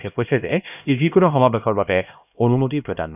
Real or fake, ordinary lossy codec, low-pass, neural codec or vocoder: fake; none; 3.6 kHz; codec, 16 kHz, 1 kbps, X-Codec, HuBERT features, trained on LibriSpeech